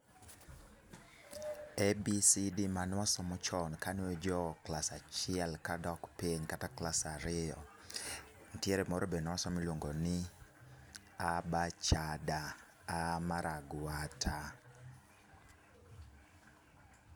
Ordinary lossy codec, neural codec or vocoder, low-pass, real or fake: none; none; none; real